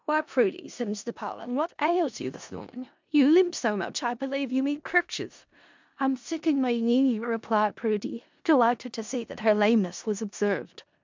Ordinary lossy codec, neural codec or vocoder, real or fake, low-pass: MP3, 64 kbps; codec, 16 kHz in and 24 kHz out, 0.4 kbps, LongCat-Audio-Codec, four codebook decoder; fake; 7.2 kHz